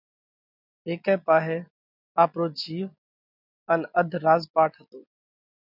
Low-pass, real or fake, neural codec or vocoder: 5.4 kHz; real; none